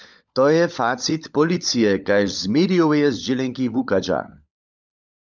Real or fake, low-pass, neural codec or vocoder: fake; 7.2 kHz; codec, 16 kHz, 16 kbps, FunCodec, trained on LibriTTS, 50 frames a second